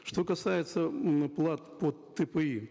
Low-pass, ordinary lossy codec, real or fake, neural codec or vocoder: none; none; real; none